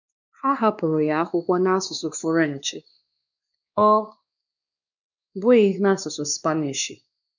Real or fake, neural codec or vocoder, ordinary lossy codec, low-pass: fake; codec, 16 kHz, 2 kbps, X-Codec, WavLM features, trained on Multilingual LibriSpeech; none; 7.2 kHz